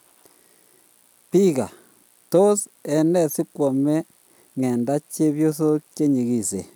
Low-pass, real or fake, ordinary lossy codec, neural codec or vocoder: none; real; none; none